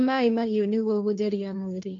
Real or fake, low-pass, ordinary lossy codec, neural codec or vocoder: fake; 7.2 kHz; none; codec, 16 kHz, 1.1 kbps, Voila-Tokenizer